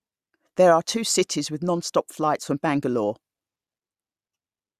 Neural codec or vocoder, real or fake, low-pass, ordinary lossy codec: none; real; 14.4 kHz; Opus, 64 kbps